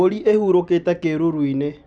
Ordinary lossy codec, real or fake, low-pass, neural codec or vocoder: none; real; 9.9 kHz; none